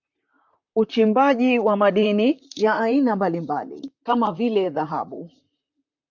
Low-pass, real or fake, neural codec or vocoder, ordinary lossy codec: 7.2 kHz; fake; vocoder, 44.1 kHz, 128 mel bands, Pupu-Vocoder; MP3, 64 kbps